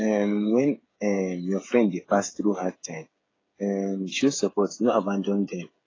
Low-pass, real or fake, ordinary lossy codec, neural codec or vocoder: 7.2 kHz; fake; AAC, 32 kbps; codec, 16 kHz, 8 kbps, FreqCodec, smaller model